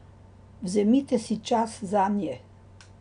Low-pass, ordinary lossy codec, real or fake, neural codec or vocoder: 9.9 kHz; none; real; none